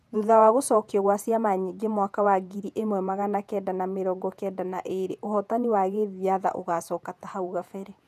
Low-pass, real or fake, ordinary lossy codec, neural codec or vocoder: 14.4 kHz; fake; none; vocoder, 48 kHz, 128 mel bands, Vocos